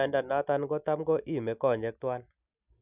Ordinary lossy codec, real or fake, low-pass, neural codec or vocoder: none; real; 3.6 kHz; none